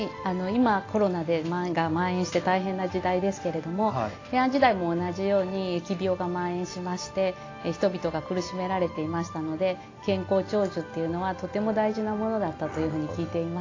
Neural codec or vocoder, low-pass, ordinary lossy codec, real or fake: none; 7.2 kHz; AAC, 32 kbps; real